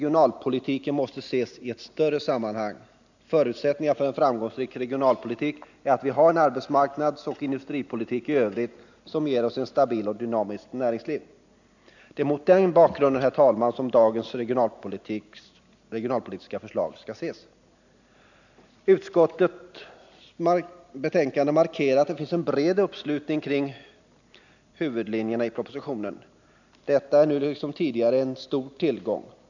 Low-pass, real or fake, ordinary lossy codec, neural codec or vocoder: 7.2 kHz; real; none; none